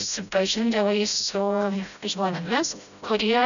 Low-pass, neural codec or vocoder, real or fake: 7.2 kHz; codec, 16 kHz, 0.5 kbps, FreqCodec, smaller model; fake